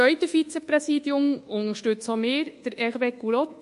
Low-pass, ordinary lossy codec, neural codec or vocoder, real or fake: 10.8 kHz; MP3, 48 kbps; codec, 24 kHz, 1.2 kbps, DualCodec; fake